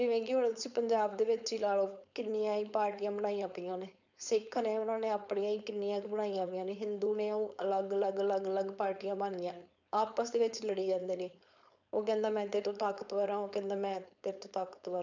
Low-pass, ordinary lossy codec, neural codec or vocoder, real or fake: 7.2 kHz; none; codec, 16 kHz, 4.8 kbps, FACodec; fake